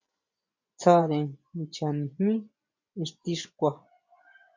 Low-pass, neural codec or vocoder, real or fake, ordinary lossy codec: 7.2 kHz; vocoder, 24 kHz, 100 mel bands, Vocos; fake; MP3, 48 kbps